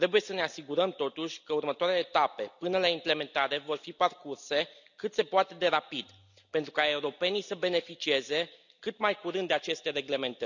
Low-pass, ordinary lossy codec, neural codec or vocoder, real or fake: 7.2 kHz; none; none; real